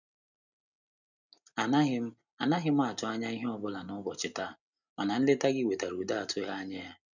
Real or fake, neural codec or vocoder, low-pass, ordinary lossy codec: real; none; 7.2 kHz; none